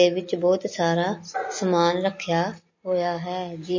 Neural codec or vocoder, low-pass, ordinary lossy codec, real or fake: none; 7.2 kHz; MP3, 32 kbps; real